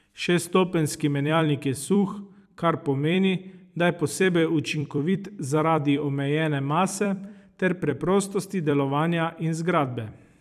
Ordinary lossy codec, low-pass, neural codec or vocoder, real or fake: none; 14.4 kHz; vocoder, 44.1 kHz, 128 mel bands every 256 samples, BigVGAN v2; fake